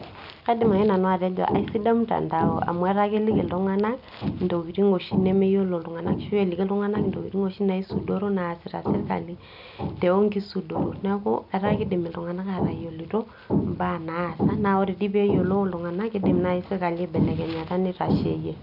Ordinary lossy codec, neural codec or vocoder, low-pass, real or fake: none; none; 5.4 kHz; real